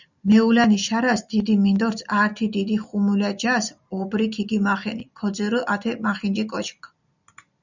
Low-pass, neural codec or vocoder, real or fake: 7.2 kHz; none; real